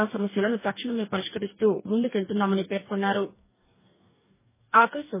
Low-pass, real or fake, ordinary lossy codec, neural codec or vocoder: 3.6 kHz; fake; MP3, 16 kbps; codec, 44.1 kHz, 2.6 kbps, DAC